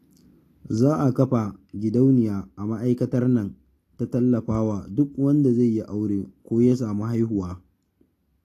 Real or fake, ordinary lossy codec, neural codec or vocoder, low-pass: real; AAC, 48 kbps; none; 14.4 kHz